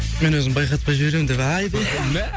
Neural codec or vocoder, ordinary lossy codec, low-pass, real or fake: none; none; none; real